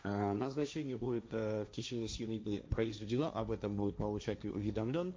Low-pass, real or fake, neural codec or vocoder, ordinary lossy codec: none; fake; codec, 16 kHz, 1.1 kbps, Voila-Tokenizer; none